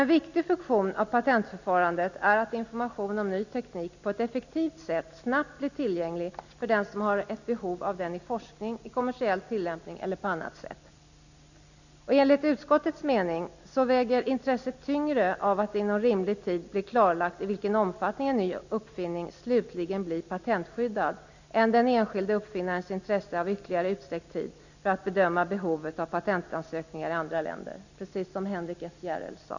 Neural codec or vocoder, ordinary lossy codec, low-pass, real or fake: none; none; 7.2 kHz; real